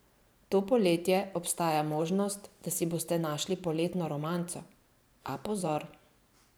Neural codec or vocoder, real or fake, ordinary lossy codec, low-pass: vocoder, 44.1 kHz, 128 mel bands every 512 samples, BigVGAN v2; fake; none; none